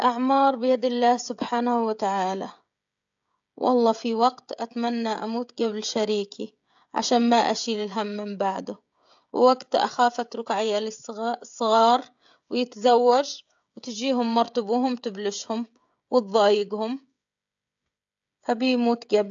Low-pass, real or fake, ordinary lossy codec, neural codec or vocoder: 7.2 kHz; fake; AAC, 64 kbps; codec, 16 kHz, 16 kbps, FreqCodec, smaller model